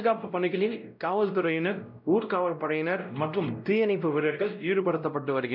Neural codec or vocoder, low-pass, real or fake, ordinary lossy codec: codec, 16 kHz, 0.5 kbps, X-Codec, WavLM features, trained on Multilingual LibriSpeech; 5.4 kHz; fake; none